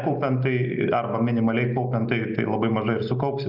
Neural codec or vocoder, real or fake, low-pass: none; real; 5.4 kHz